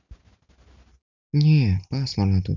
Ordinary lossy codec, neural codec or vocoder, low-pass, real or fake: none; none; 7.2 kHz; real